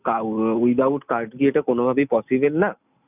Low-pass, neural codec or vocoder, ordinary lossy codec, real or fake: 3.6 kHz; none; none; real